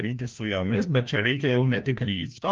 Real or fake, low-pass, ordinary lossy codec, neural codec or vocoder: fake; 7.2 kHz; Opus, 32 kbps; codec, 16 kHz, 1 kbps, FreqCodec, larger model